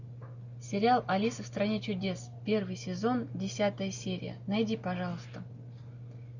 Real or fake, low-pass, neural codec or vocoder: real; 7.2 kHz; none